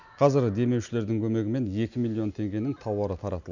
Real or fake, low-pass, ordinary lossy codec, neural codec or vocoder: real; 7.2 kHz; none; none